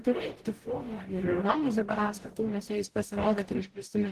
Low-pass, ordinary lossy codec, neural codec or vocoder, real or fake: 14.4 kHz; Opus, 16 kbps; codec, 44.1 kHz, 0.9 kbps, DAC; fake